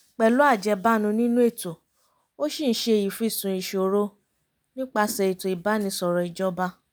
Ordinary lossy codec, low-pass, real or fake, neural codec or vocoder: none; none; real; none